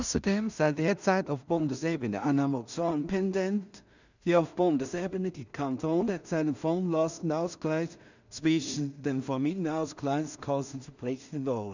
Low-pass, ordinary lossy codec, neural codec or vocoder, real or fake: 7.2 kHz; none; codec, 16 kHz in and 24 kHz out, 0.4 kbps, LongCat-Audio-Codec, two codebook decoder; fake